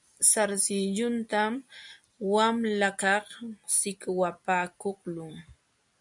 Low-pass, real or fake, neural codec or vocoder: 10.8 kHz; real; none